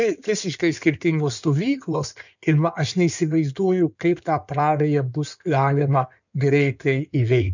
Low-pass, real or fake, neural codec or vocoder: 7.2 kHz; fake; codec, 16 kHz in and 24 kHz out, 2.2 kbps, FireRedTTS-2 codec